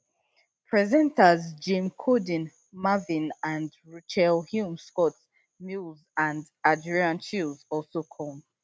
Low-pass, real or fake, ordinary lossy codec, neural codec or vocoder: none; real; none; none